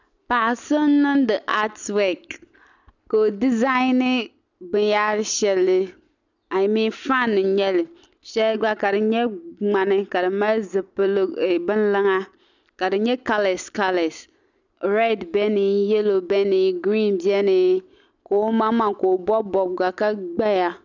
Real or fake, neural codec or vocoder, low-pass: real; none; 7.2 kHz